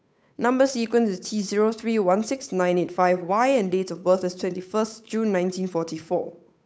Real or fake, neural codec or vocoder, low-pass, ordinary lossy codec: fake; codec, 16 kHz, 8 kbps, FunCodec, trained on Chinese and English, 25 frames a second; none; none